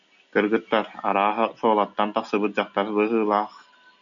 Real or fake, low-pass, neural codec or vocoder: real; 7.2 kHz; none